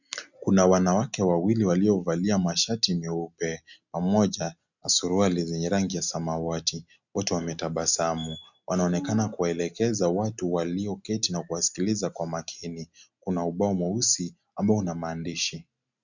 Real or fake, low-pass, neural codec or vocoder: real; 7.2 kHz; none